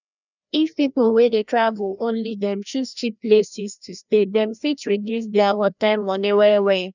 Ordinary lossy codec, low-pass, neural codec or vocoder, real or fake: none; 7.2 kHz; codec, 16 kHz, 1 kbps, FreqCodec, larger model; fake